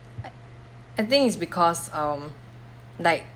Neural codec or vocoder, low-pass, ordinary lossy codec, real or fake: none; 19.8 kHz; Opus, 32 kbps; real